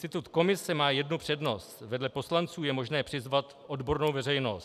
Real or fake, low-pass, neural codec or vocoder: real; 14.4 kHz; none